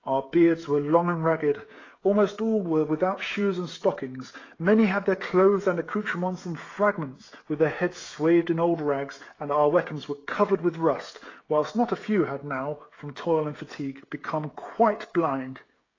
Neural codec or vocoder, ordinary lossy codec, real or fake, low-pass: codec, 16 kHz, 16 kbps, FreqCodec, smaller model; AAC, 32 kbps; fake; 7.2 kHz